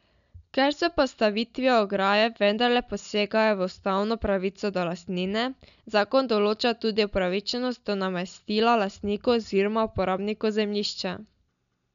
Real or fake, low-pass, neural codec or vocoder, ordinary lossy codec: real; 7.2 kHz; none; MP3, 96 kbps